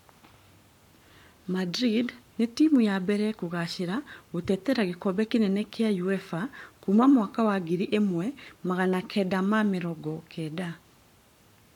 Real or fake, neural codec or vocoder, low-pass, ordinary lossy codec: fake; codec, 44.1 kHz, 7.8 kbps, Pupu-Codec; 19.8 kHz; none